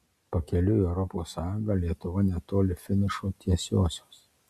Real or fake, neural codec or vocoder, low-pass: real; none; 14.4 kHz